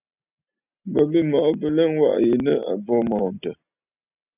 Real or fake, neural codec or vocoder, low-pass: real; none; 3.6 kHz